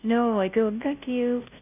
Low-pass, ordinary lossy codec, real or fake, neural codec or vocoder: 3.6 kHz; none; fake; codec, 16 kHz, 0.5 kbps, FunCodec, trained on Chinese and English, 25 frames a second